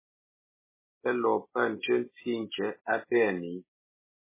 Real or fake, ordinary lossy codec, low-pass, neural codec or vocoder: real; MP3, 16 kbps; 3.6 kHz; none